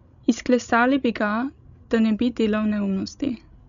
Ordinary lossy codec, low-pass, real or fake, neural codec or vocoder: none; 7.2 kHz; fake; codec, 16 kHz, 16 kbps, FreqCodec, larger model